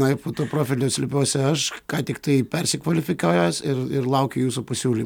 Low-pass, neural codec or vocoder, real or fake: 19.8 kHz; none; real